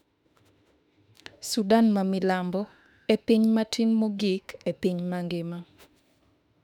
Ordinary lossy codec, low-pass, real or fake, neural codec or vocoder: none; 19.8 kHz; fake; autoencoder, 48 kHz, 32 numbers a frame, DAC-VAE, trained on Japanese speech